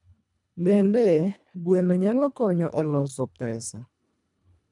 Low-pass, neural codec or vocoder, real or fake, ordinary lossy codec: 10.8 kHz; codec, 24 kHz, 1.5 kbps, HILCodec; fake; none